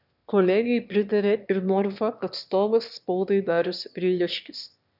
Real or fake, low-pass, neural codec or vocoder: fake; 5.4 kHz; autoencoder, 22.05 kHz, a latent of 192 numbers a frame, VITS, trained on one speaker